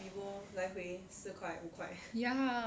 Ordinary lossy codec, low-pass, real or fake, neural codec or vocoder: none; none; real; none